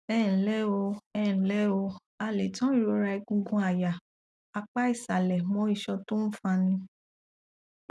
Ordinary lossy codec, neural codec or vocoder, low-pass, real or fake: none; none; none; real